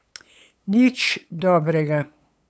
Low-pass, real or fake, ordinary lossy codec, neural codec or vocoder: none; fake; none; codec, 16 kHz, 8 kbps, FunCodec, trained on LibriTTS, 25 frames a second